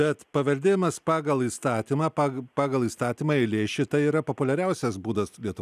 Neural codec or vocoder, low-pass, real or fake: none; 14.4 kHz; real